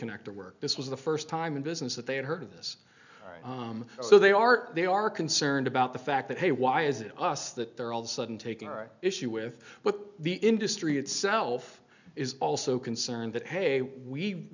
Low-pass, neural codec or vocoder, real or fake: 7.2 kHz; none; real